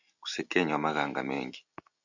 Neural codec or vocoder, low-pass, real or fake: none; 7.2 kHz; real